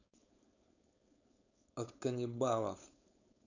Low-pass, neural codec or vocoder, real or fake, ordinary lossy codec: 7.2 kHz; codec, 16 kHz, 4.8 kbps, FACodec; fake; none